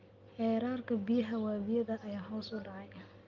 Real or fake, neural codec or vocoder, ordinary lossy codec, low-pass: real; none; Opus, 32 kbps; 7.2 kHz